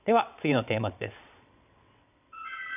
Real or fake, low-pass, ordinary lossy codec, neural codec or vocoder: fake; 3.6 kHz; none; vocoder, 22.05 kHz, 80 mel bands, WaveNeXt